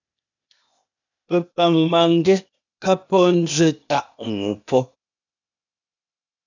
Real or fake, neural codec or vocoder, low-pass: fake; codec, 16 kHz, 0.8 kbps, ZipCodec; 7.2 kHz